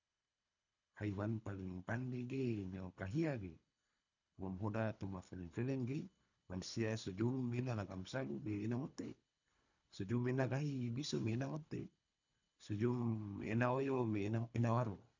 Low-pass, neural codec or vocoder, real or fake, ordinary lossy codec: 7.2 kHz; codec, 24 kHz, 3 kbps, HILCodec; fake; none